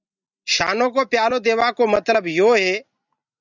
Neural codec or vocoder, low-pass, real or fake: none; 7.2 kHz; real